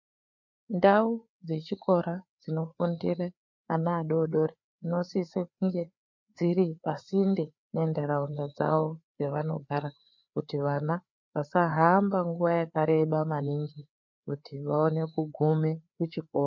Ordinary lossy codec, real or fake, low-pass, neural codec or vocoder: MP3, 64 kbps; fake; 7.2 kHz; codec, 16 kHz, 4 kbps, FreqCodec, larger model